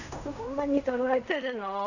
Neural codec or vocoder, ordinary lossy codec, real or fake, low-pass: codec, 16 kHz in and 24 kHz out, 0.4 kbps, LongCat-Audio-Codec, fine tuned four codebook decoder; none; fake; 7.2 kHz